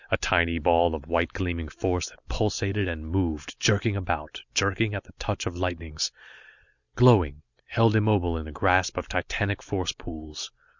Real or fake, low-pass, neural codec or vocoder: real; 7.2 kHz; none